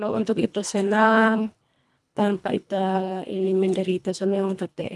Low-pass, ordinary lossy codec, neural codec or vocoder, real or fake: none; none; codec, 24 kHz, 1.5 kbps, HILCodec; fake